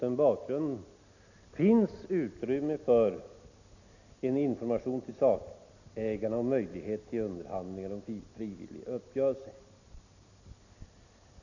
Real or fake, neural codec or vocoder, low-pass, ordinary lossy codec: real; none; 7.2 kHz; none